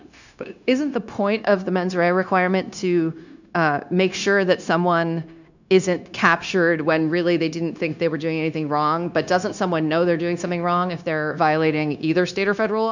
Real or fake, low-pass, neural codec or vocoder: fake; 7.2 kHz; codec, 16 kHz, 0.9 kbps, LongCat-Audio-Codec